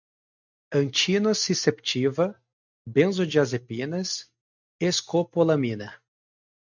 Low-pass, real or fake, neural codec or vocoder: 7.2 kHz; real; none